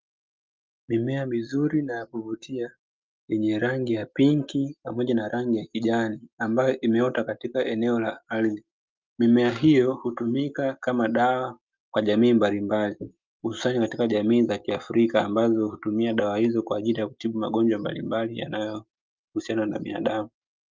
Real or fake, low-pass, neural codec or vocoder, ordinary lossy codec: real; 7.2 kHz; none; Opus, 24 kbps